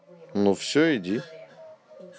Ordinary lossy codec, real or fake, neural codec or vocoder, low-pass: none; real; none; none